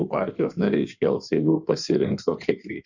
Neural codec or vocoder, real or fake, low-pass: codec, 16 kHz in and 24 kHz out, 1.1 kbps, FireRedTTS-2 codec; fake; 7.2 kHz